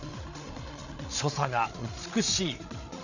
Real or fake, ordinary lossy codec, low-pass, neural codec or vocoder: fake; none; 7.2 kHz; codec, 16 kHz, 8 kbps, FreqCodec, larger model